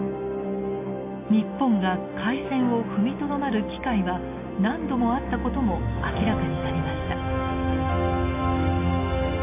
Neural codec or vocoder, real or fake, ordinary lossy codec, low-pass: none; real; AAC, 24 kbps; 3.6 kHz